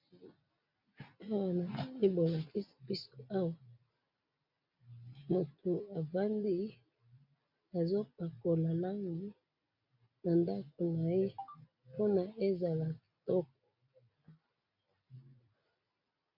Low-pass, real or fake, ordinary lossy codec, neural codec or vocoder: 5.4 kHz; real; MP3, 48 kbps; none